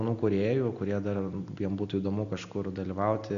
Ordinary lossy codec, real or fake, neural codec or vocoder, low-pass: Opus, 64 kbps; real; none; 7.2 kHz